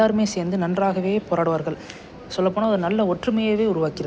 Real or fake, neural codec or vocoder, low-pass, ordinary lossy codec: real; none; none; none